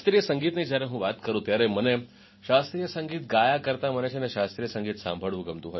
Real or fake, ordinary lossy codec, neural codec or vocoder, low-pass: real; MP3, 24 kbps; none; 7.2 kHz